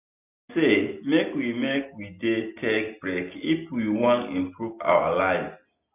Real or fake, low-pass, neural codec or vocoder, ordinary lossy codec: real; 3.6 kHz; none; none